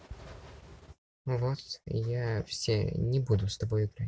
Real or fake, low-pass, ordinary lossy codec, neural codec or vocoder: real; none; none; none